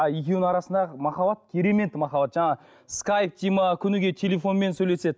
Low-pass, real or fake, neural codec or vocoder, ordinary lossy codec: none; real; none; none